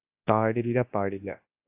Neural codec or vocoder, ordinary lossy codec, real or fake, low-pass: codec, 24 kHz, 0.9 kbps, WavTokenizer, large speech release; AAC, 32 kbps; fake; 3.6 kHz